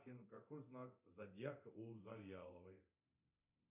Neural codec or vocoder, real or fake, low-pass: codec, 24 kHz, 0.9 kbps, DualCodec; fake; 3.6 kHz